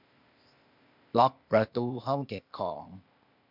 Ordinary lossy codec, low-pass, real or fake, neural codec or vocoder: MP3, 48 kbps; 5.4 kHz; fake; codec, 16 kHz, 0.8 kbps, ZipCodec